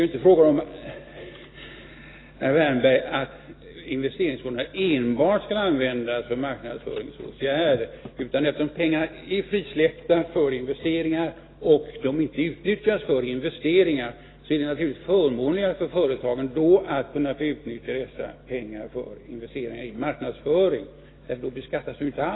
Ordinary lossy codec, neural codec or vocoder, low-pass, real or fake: AAC, 16 kbps; none; 7.2 kHz; real